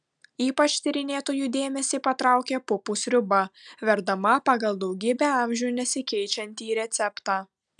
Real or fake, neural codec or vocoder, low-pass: real; none; 9.9 kHz